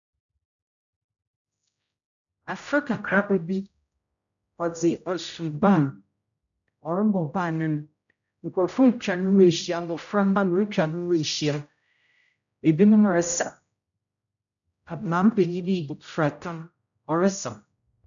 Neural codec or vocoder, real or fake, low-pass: codec, 16 kHz, 0.5 kbps, X-Codec, HuBERT features, trained on general audio; fake; 7.2 kHz